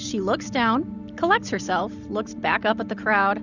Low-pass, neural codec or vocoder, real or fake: 7.2 kHz; none; real